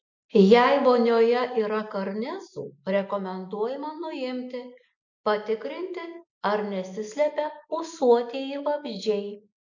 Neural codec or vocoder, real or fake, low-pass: none; real; 7.2 kHz